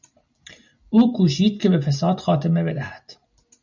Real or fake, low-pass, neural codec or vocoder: real; 7.2 kHz; none